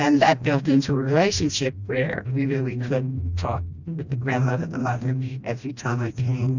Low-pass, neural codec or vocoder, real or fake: 7.2 kHz; codec, 16 kHz, 1 kbps, FreqCodec, smaller model; fake